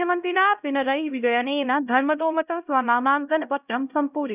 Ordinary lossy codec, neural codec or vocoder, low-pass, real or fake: none; codec, 16 kHz, 0.5 kbps, X-Codec, HuBERT features, trained on LibriSpeech; 3.6 kHz; fake